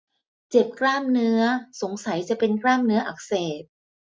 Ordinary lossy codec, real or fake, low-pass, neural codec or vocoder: none; real; none; none